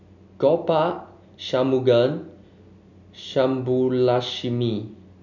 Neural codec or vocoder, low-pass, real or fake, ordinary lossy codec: none; 7.2 kHz; real; none